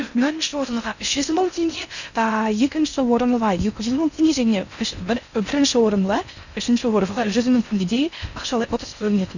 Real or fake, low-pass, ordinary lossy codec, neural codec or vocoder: fake; 7.2 kHz; none; codec, 16 kHz in and 24 kHz out, 0.6 kbps, FocalCodec, streaming, 2048 codes